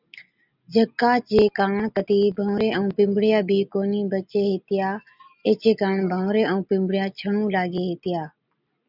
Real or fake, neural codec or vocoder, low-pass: real; none; 5.4 kHz